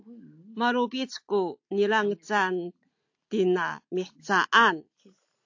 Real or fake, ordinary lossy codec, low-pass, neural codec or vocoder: real; AAC, 48 kbps; 7.2 kHz; none